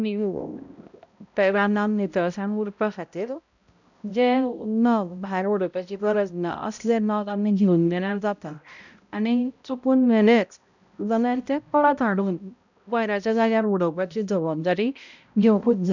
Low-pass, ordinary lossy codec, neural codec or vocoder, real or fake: 7.2 kHz; none; codec, 16 kHz, 0.5 kbps, X-Codec, HuBERT features, trained on balanced general audio; fake